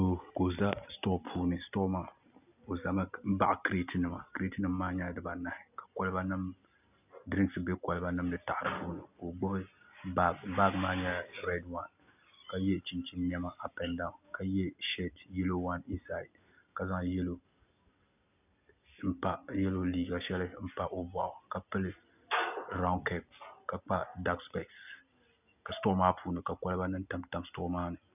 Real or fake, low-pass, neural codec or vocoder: real; 3.6 kHz; none